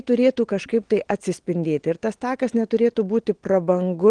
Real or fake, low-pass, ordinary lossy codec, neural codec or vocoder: real; 9.9 kHz; Opus, 16 kbps; none